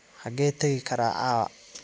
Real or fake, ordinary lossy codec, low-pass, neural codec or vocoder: real; none; none; none